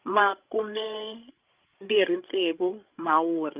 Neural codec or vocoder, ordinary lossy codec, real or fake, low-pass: codec, 16 kHz, 16 kbps, FreqCodec, larger model; Opus, 24 kbps; fake; 3.6 kHz